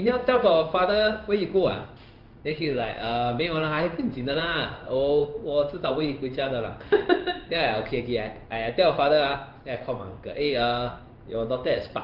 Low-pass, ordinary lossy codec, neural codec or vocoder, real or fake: 5.4 kHz; Opus, 32 kbps; codec, 16 kHz in and 24 kHz out, 1 kbps, XY-Tokenizer; fake